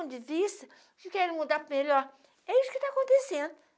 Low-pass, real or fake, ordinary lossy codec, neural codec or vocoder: none; real; none; none